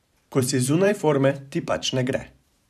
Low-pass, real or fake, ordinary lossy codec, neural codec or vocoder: 14.4 kHz; fake; none; vocoder, 44.1 kHz, 128 mel bands every 512 samples, BigVGAN v2